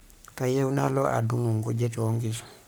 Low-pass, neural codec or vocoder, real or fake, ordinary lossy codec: none; codec, 44.1 kHz, 3.4 kbps, Pupu-Codec; fake; none